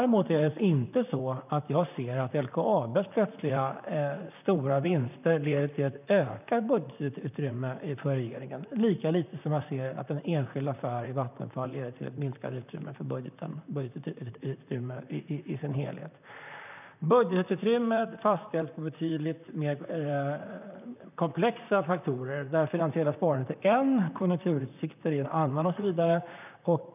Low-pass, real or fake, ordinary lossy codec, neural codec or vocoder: 3.6 kHz; fake; none; vocoder, 44.1 kHz, 128 mel bands, Pupu-Vocoder